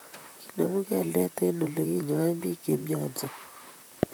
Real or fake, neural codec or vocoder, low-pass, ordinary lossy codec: fake; vocoder, 44.1 kHz, 128 mel bands, Pupu-Vocoder; none; none